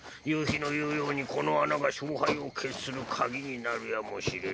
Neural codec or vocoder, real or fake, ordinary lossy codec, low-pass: none; real; none; none